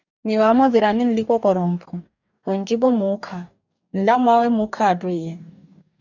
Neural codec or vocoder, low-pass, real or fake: codec, 44.1 kHz, 2.6 kbps, DAC; 7.2 kHz; fake